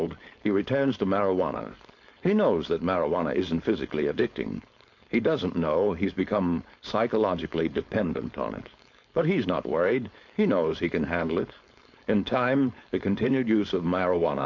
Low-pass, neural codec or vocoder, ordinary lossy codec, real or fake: 7.2 kHz; codec, 16 kHz, 4.8 kbps, FACodec; MP3, 48 kbps; fake